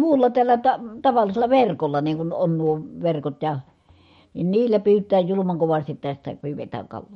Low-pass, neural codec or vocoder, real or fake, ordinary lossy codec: 19.8 kHz; autoencoder, 48 kHz, 128 numbers a frame, DAC-VAE, trained on Japanese speech; fake; MP3, 48 kbps